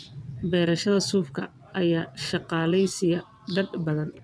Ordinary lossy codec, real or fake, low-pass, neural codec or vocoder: none; fake; none; vocoder, 22.05 kHz, 80 mel bands, WaveNeXt